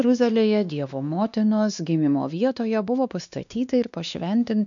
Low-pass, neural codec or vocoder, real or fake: 7.2 kHz; codec, 16 kHz, 2 kbps, X-Codec, WavLM features, trained on Multilingual LibriSpeech; fake